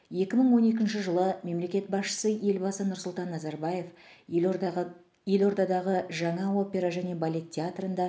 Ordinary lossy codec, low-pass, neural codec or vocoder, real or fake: none; none; none; real